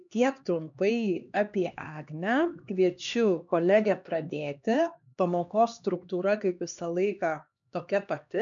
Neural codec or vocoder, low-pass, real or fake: codec, 16 kHz, 2 kbps, X-Codec, HuBERT features, trained on LibriSpeech; 7.2 kHz; fake